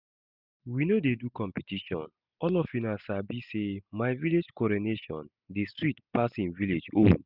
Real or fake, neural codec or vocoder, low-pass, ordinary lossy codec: real; none; 5.4 kHz; none